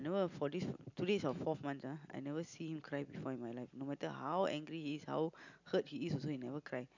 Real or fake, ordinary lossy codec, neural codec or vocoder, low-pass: real; none; none; 7.2 kHz